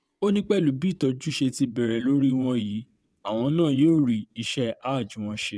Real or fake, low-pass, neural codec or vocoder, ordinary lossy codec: fake; none; vocoder, 22.05 kHz, 80 mel bands, WaveNeXt; none